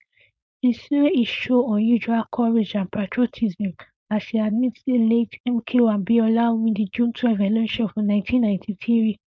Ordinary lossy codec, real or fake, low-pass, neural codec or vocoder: none; fake; none; codec, 16 kHz, 4.8 kbps, FACodec